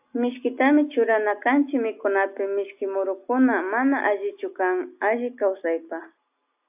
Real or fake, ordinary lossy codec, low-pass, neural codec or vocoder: real; AAC, 32 kbps; 3.6 kHz; none